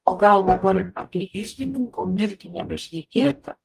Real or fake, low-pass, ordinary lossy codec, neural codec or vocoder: fake; 14.4 kHz; Opus, 32 kbps; codec, 44.1 kHz, 0.9 kbps, DAC